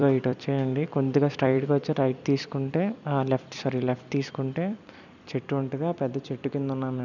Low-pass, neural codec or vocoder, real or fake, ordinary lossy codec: 7.2 kHz; vocoder, 44.1 kHz, 128 mel bands every 256 samples, BigVGAN v2; fake; none